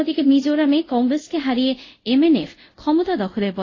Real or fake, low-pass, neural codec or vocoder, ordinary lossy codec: fake; 7.2 kHz; codec, 24 kHz, 0.5 kbps, DualCodec; AAC, 32 kbps